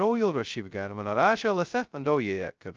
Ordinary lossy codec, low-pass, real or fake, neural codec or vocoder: Opus, 24 kbps; 7.2 kHz; fake; codec, 16 kHz, 0.2 kbps, FocalCodec